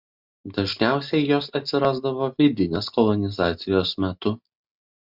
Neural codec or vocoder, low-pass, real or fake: none; 5.4 kHz; real